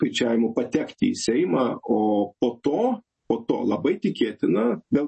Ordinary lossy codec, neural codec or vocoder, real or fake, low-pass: MP3, 32 kbps; none; real; 9.9 kHz